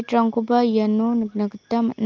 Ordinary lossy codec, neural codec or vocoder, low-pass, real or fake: Opus, 24 kbps; none; 7.2 kHz; real